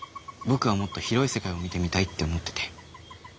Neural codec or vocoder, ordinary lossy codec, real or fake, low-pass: none; none; real; none